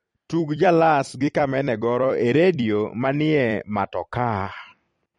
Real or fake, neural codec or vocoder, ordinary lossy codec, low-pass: fake; vocoder, 44.1 kHz, 128 mel bands every 256 samples, BigVGAN v2; MP3, 48 kbps; 19.8 kHz